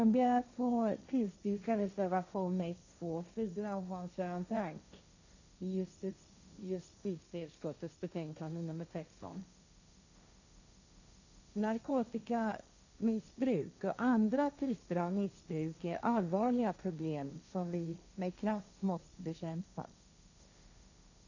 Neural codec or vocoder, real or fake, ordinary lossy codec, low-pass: codec, 16 kHz, 1.1 kbps, Voila-Tokenizer; fake; none; 7.2 kHz